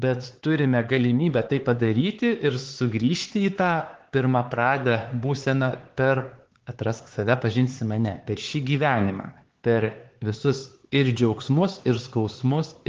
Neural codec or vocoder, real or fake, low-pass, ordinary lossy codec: codec, 16 kHz, 4 kbps, X-Codec, HuBERT features, trained on LibriSpeech; fake; 7.2 kHz; Opus, 16 kbps